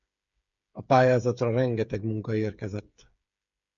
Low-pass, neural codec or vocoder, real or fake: 7.2 kHz; codec, 16 kHz, 8 kbps, FreqCodec, smaller model; fake